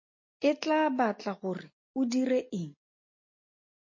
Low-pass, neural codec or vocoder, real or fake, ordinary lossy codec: 7.2 kHz; none; real; MP3, 32 kbps